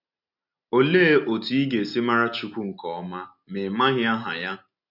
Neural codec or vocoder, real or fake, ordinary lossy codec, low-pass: none; real; none; 5.4 kHz